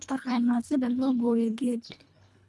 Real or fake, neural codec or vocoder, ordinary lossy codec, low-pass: fake; codec, 24 kHz, 1.5 kbps, HILCodec; none; none